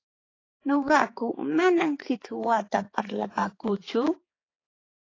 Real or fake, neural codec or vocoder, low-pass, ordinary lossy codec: fake; codec, 16 kHz, 4 kbps, X-Codec, HuBERT features, trained on balanced general audio; 7.2 kHz; AAC, 32 kbps